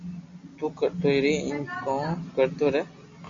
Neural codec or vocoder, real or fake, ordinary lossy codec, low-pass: none; real; MP3, 48 kbps; 7.2 kHz